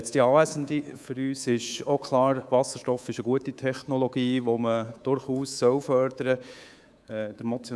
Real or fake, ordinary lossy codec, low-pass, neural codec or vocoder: fake; none; none; codec, 24 kHz, 3.1 kbps, DualCodec